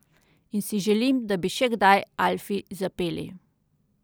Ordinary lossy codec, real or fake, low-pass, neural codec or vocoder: none; real; none; none